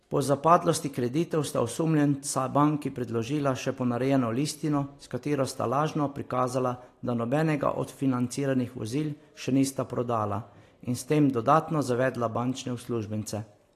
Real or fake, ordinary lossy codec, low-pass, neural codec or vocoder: real; AAC, 48 kbps; 14.4 kHz; none